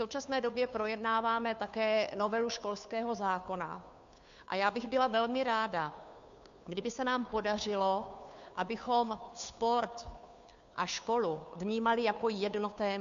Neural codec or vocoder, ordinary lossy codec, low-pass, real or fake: codec, 16 kHz, 2 kbps, FunCodec, trained on Chinese and English, 25 frames a second; MP3, 64 kbps; 7.2 kHz; fake